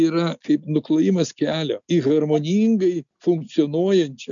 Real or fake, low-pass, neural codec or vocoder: real; 7.2 kHz; none